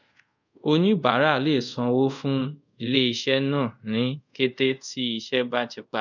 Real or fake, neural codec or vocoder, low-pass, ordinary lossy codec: fake; codec, 24 kHz, 0.5 kbps, DualCodec; 7.2 kHz; none